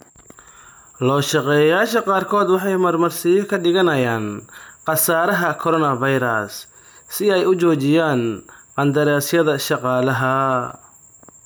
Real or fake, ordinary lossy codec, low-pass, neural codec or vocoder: real; none; none; none